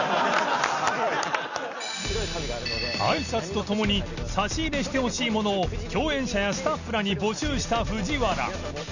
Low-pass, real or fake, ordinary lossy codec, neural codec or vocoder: 7.2 kHz; real; none; none